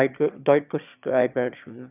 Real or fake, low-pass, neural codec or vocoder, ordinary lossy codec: fake; 3.6 kHz; autoencoder, 22.05 kHz, a latent of 192 numbers a frame, VITS, trained on one speaker; none